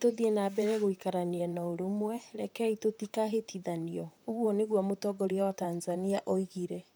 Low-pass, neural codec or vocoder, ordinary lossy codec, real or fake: none; vocoder, 44.1 kHz, 128 mel bands, Pupu-Vocoder; none; fake